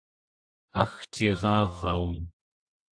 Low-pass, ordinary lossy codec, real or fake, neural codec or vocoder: 9.9 kHz; AAC, 64 kbps; fake; codec, 24 kHz, 0.9 kbps, WavTokenizer, medium music audio release